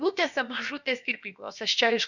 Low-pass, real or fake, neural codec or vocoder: 7.2 kHz; fake; codec, 16 kHz, about 1 kbps, DyCAST, with the encoder's durations